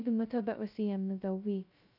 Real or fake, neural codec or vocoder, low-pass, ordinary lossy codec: fake; codec, 16 kHz, 0.2 kbps, FocalCodec; 5.4 kHz; none